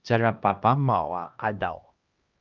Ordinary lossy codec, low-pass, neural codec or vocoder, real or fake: Opus, 24 kbps; 7.2 kHz; codec, 16 kHz, 1 kbps, X-Codec, HuBERT features, trained on LibriSpeech; fake